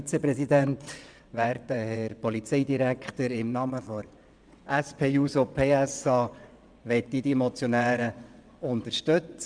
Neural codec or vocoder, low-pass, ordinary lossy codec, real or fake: vocoder, 22.05 kHz, 80 mel bands, WaveNeXt; 9.9 kHz; Opus, 64 kbps; fake